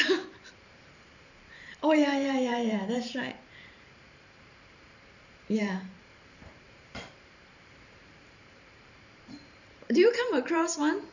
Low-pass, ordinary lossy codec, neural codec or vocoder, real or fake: 7.2 kHz; none; vocoder, 44.1 kHz, 128 mel bands every 512 samples, BigVGAN v2; fake